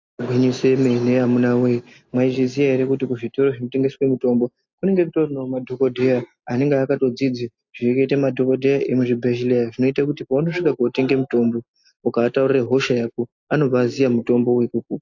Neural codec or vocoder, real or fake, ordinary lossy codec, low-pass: none; real; AAC, 48 kbps; 7.2 kHz